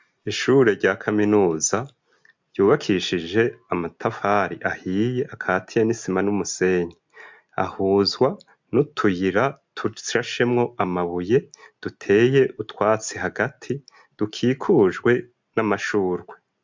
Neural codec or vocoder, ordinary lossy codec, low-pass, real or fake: none; MP3, 64 kbps; 7.2 kHz; real